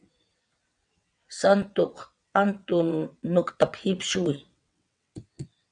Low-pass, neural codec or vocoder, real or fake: 9.9 kHz; vocoder, 22.05 kHz, 80 mel bands, WaveNeXt; fake